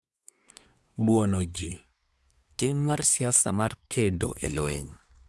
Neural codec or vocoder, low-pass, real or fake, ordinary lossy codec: codec, 24 kHz, 1 kbps, SNAC; none; fake; none